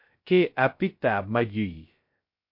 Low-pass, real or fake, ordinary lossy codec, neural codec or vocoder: 5.4 kHz; fake; MP3, 32 kbps; codec, 16 kHz, 0.2 kbps, FocalCodec